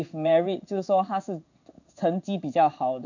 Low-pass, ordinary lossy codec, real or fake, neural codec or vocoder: 7.2 kHz; none; fake; codec, 16 kHz in and 24 kHz out, 1 kbps, XY-Tokenizer